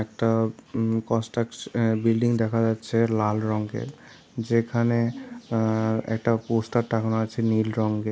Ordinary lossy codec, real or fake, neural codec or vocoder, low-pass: none; real; none; none